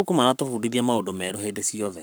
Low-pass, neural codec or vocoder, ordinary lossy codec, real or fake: none; codec, 44.1 kHz, 7.8 kbps, Pupu-Codec; none; fake